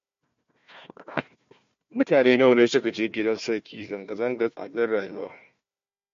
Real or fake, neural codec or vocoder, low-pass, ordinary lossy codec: fake; codec, 16 kHz, 1 kbps, FunCodec, trained on Chinese and English, 50 frames a second; 7.2 kHz; MP3, 48 kbps